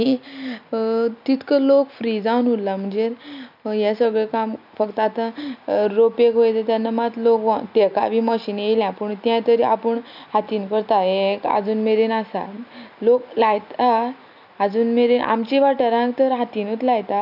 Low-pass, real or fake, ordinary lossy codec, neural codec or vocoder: 5.4 kHz; real; none; none